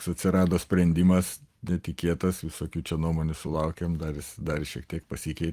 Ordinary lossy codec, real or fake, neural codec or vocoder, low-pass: Opus, 32 kbps; fake; vocoder, 44.1 kHz, 128 mel bands every 256 samples, BigVGAN v2; 14.4 kHz